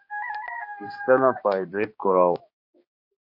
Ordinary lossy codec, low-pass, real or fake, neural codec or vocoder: MP3, 32 kbps; 5.4 kHz; fake; codec, 16 kHz, 2 kbps, X-Codec, HuBERT features, trained on balanced general audio